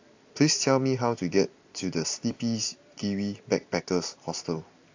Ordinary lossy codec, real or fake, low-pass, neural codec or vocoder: AAC, 48 kbps; fake; 7.2 kHz; vocoder, 44.1 kHz, 128 mel bands every 256 samples, BigVGAN v2